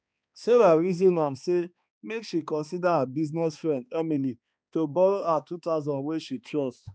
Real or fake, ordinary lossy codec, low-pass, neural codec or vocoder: fake; none; none; codec, 16 kHz, 2 kbps, X-Codec, HuBERT features, trained on balanced general audio